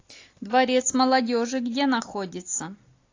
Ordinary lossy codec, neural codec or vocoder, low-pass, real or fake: AAC, 48 kbps; none; 7.2 kHz; real